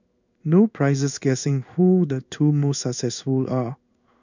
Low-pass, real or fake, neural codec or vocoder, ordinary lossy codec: 7.2 kHz; fake; codec, 16 kHz in and 24 kHz out, 1 kbps, XY-Tokenizer; none